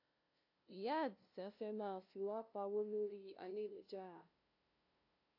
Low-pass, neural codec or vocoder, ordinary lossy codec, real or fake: 5.4 kHz; codec, 16 kHz, 0.5 kbps, FunCodec, trained on LibriTTS, 25 frames a second; AAC, 32 kbps; fake